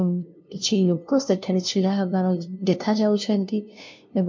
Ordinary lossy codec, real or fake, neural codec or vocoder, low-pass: MP3, 48 kbps; fake; codec, 16 kHz, 0.5 kbps, FunCodec, trained on LibriTTS, 25 frames a second; 7.2 kHz